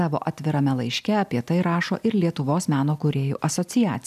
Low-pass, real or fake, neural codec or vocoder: 14.4 kHz; real; none